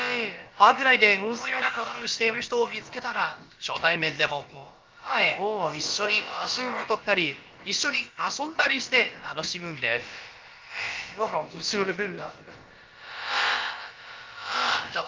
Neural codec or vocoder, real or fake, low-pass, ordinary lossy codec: codec, 16 kHz, about 1 kbps, DyCAST, with the encoder's durations; fake; 7.2 kHz; Opus, 24 kbps